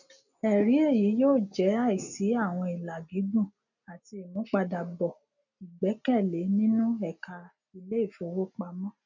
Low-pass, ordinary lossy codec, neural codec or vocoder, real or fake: 7.2 kHz; none; none; real